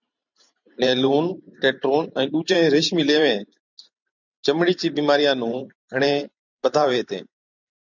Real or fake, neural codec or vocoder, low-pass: fake; vocoder, 44.1 kHz, 128 mel bands every 512 samples, BigVGAN v2; 7.2 kHz